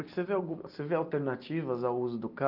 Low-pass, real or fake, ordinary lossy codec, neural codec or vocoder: 5.4 kHz; real; Opus, 32 kbps; none